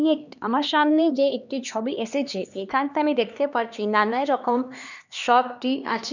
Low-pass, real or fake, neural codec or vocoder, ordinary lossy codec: 7.2 kHz; fake; codec, 16 kHz, 2 kbps, X-Codec, HuBERT features, trained on LibriSpeech; none